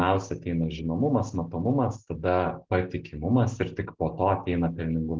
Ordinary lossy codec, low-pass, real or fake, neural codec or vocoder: Opus, 16 kbps; 7.2 kHz; real; none